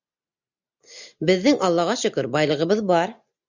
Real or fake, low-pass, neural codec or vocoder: real; 7.2 kHz; none